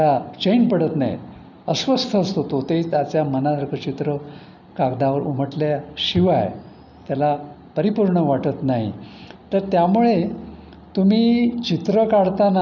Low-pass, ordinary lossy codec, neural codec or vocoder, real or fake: 7.2 kHz; none; none; real